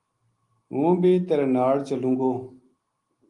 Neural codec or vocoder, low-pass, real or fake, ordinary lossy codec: none; 10.8 kHz; real; Opus, 24 kbps